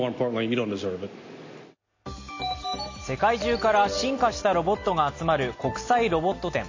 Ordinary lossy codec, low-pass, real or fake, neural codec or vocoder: MP3, 32 kbps; 7.2 kHz; real; none